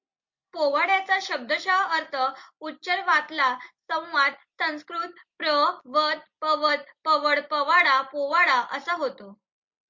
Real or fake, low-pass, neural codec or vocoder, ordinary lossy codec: real; 7.2 kHz; none; MP3, 48 kbps